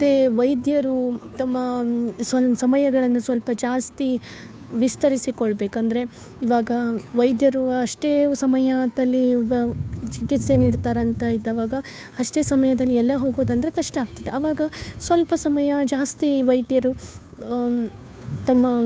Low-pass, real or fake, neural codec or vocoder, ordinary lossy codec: none; fake; codec, 16 kHz, 2 kbps, FunCodec, trained on Chinese and English, 25 frames a second; none